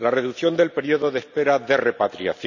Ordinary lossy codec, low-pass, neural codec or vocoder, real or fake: none; 7.2 kHz; none; real